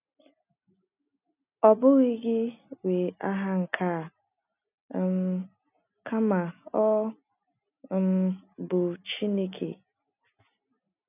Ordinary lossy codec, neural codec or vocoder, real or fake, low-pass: none; none; real; 3.6 kHz